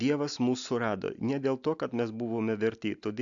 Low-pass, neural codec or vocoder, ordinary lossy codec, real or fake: 7.2 kHz; none; AAC, 64 kbps; real